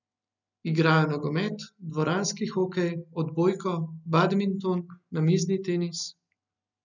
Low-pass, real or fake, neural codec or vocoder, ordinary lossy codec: 7.2 kHz; real; none; none